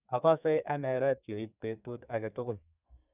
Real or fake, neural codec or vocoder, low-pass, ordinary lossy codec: fake; codec, 32 kHz, 1.9 kbps, SNAC; 3.6 kHz; none